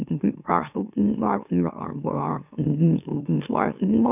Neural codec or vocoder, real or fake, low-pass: autoencoder, 44.1 kHz, a latent of 192 numbers a frame, MeloTTS; fake; 3.6 kHz